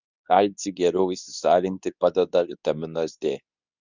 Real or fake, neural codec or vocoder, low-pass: fake; codec, 24 kHz, 0.9 kbps, WavTokenizer, medium speech release version 2; 7.2 kHz